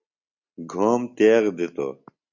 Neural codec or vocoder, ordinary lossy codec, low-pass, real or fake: none; Opus, 64 kbps; 7.2 kHz; real